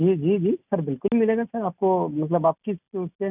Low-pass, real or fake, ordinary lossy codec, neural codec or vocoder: 3.6 kHz; real; none; none